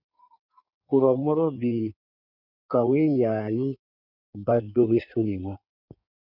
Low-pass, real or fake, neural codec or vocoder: 5.4 kHz; fake; codec, 16 kHz in and 24 kHz out, 1.1 kbps, FireRedTTS-2 codec